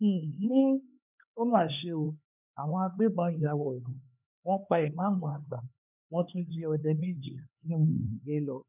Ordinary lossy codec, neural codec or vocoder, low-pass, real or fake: none; codec, 16 kHz, 4 kbps, X-Codec, HuBERT features, trained on LibriSpeech; 3.6 kHz; fake